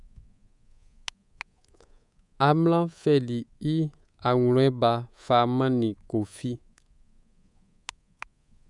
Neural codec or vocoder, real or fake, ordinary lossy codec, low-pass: codec, 24 kHz, 3.1 kbps, DualCodec; fake; none; 10.8 kHz